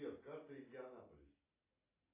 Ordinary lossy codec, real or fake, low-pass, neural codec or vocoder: AAC, 24 kbps; real; 3.6 kHz; none